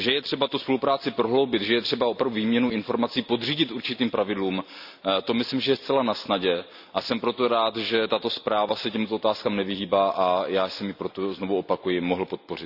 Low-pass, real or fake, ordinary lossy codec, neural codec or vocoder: 5.4 kHz; real; none; none